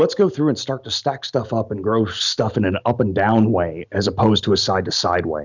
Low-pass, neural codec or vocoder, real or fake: 7.2 kHz; none; real